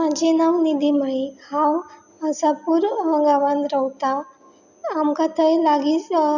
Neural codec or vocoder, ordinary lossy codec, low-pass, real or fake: none; none; 7.2 kHz; real